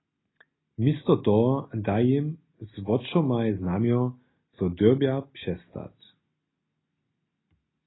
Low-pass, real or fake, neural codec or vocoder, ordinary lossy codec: 7.2 kHz; real; none; AAC, 16 kbps